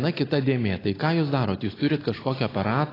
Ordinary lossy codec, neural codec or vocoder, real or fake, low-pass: AAC, 24 kbps; none; real; 5.4 kHz